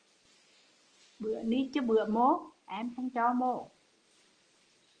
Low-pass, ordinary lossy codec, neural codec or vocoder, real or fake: 9.9 kHz; Opus, 24 kbps; none; real